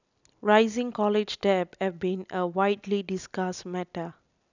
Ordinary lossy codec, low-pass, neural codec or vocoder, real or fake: none; 7.2 kHz; none; real